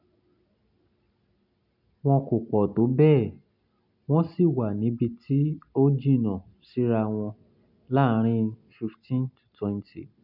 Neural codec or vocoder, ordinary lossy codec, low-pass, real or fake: none; none; 5.4 kHz; real